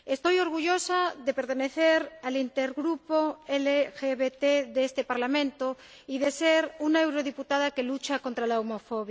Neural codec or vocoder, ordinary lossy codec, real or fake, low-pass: none; none; real; none